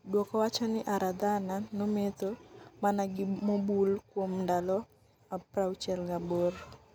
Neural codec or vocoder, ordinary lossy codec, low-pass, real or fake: none; none; none; real